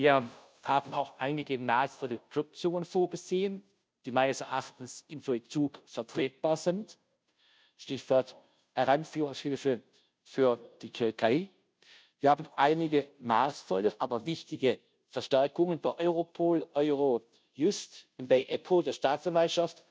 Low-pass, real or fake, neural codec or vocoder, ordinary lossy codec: none; fake; codec, 16 kHz, 0.5 kbps, FunCodec, trained on Chinese and English, 25 frames a second; none